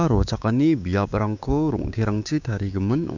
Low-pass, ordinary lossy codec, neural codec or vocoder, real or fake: 7.2 kHz; none; codec, 16 kHz, 6 kbps, DAC; fake